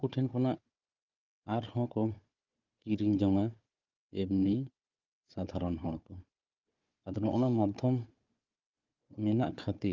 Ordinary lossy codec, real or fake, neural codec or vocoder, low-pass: Opus, 32 kbps; fake; codec, 16 kHz, 4 kbps, FunCodec, trained on Chinese and English, 50 frames a second; 7.2 kHz